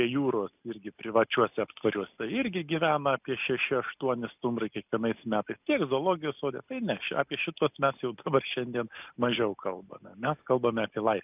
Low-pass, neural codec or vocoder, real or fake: 3.6 kHz; none; real